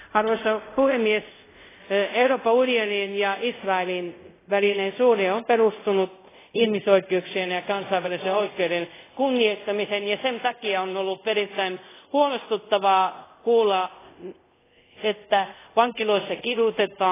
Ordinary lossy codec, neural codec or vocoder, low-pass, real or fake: AAC, 16 kbps; codec, 24 kHz, 0.5 kbps, DualCodec; 3.6 kHz; fake